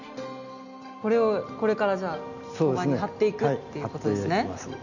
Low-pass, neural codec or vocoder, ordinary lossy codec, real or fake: 7.2 kHz; none; none; real